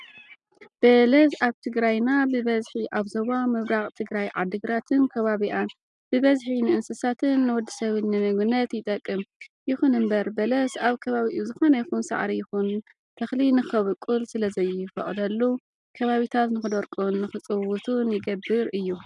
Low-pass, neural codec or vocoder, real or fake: 10.8 kHz; none; real